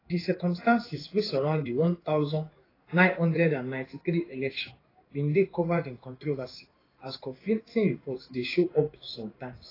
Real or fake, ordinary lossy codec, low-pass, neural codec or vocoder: fake; AAC, 24 kbps; 5.4 kHz; codec, 16 kHz in and 24 kHz out, 1 kbps, XY-Tokenizer